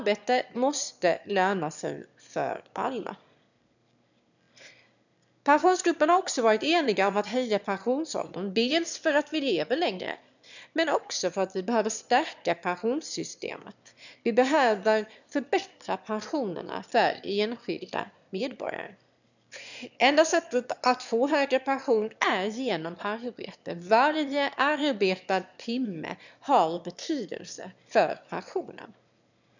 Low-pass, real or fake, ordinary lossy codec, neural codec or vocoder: 7.2 kHz; fake; none; autoencoder, 22.05 kHz, a latent of 192 numbers a frame, VITS, trained on one speaker